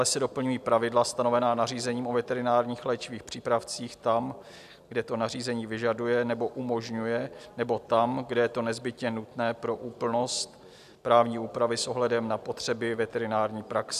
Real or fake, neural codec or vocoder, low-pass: real; none; 14.4 kHz